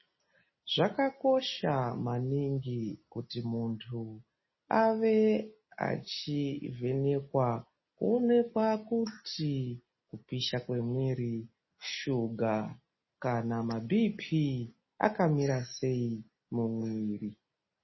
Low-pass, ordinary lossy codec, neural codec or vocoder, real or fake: 7.2 kHz; MP3, 24 kbps; none; real